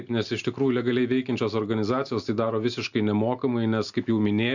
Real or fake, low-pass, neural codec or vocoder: real; 7.2 kHz; none